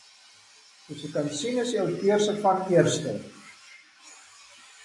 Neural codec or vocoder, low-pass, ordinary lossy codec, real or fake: none; 10.8 kHz; MP3, 64 kbps; real